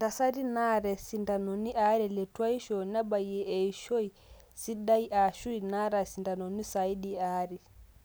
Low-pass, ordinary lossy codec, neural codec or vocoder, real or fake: none; none; none; real